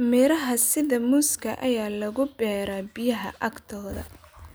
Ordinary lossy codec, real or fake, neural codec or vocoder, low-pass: none; real; none; none